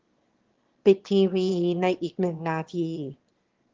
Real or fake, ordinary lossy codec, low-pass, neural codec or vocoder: fake; Opus, 16 kbps; 7.2 kHz; autoencoder, 22.05 kHz, a latent of 192 numbers a frame, VITS, trained on one speaker